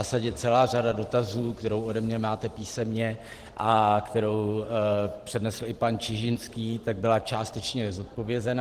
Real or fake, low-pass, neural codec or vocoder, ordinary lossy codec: fake; 14.4 kHz; vocoder, 44.1 kHz, 128 mel bands every 512 samples, BigVGAN v2; Opus, 16 kbps